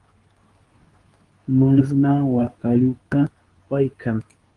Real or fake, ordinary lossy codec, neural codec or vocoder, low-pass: fake; Opus, 24 kbps; codec, 24 kHz, 0.9 kbps, WavTokenizer, medium speech release version 2; 10.8 kHz